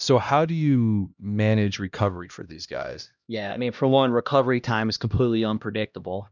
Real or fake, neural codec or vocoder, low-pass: fake; codec, 16 kHz, 1 kbps, X-Codec, HuBERT features, trained on LibriSpeech; 7.2 kHz